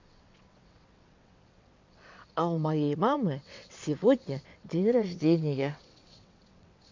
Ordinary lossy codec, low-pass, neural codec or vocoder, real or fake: MP3, 48 kbps; 7.2 kHz; vocoder, 22.05 kHz, 80 mel bands, WaveNeXt; fake